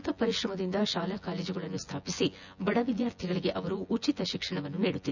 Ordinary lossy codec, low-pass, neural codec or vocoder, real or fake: none; 7.2 kHz; vocoder, 24 kHz, 100 mel bands, Vocos; fake